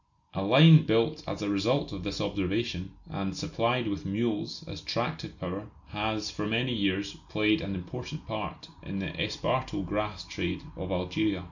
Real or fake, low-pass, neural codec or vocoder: real; 7.2 kHz; none